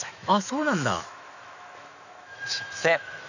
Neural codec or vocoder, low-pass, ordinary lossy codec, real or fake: autoencoder, 48 kHz, 128 numbers a frame, DAC-VAE, trained on Japanese speech; 7.2 kHz; none; fake